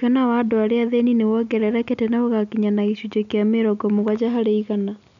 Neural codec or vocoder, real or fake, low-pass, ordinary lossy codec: none; real; 7.2 kHz; none